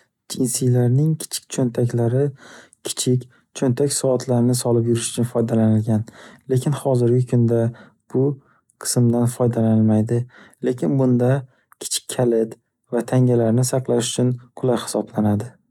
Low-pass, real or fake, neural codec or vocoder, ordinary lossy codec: 19.8 kHz; real; none; none